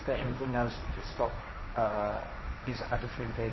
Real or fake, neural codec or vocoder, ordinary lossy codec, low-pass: fake; codec, 16 kHz, 1.1 kbps, Voila-Tokenizer; MP3, 24 kbps; 7.2 kHz